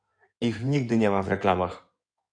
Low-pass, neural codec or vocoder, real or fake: 9.9 kHz; codec, 16 kHz in and 24 kHz out, 2.2 kbps, FireRedTTS-2 codec; fake